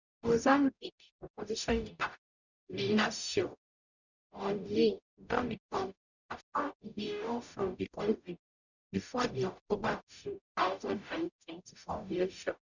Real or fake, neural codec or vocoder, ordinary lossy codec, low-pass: fake; codec, 44.1 kHz, 0.9 kbps, DAC; none; 7.2 kHz